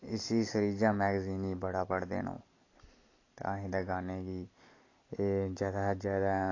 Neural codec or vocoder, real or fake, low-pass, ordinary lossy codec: none; real; 7.2 kHz; AAC, 32 kbps